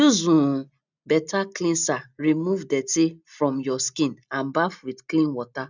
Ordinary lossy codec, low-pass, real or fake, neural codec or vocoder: none; 7.2 kHz; real; none